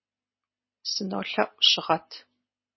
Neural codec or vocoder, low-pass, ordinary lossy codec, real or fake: none; 7.2 kHz; MP3, 24 kbps; real